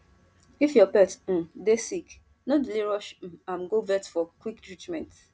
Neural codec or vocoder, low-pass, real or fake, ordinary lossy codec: none; none; real; none